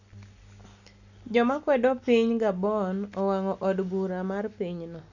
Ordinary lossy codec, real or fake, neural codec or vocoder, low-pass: none; real; none; 7.2 kHz